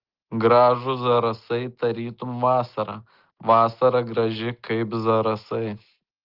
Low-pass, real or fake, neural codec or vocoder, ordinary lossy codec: 5.4 kHz; real; none; Opus, 16 kbps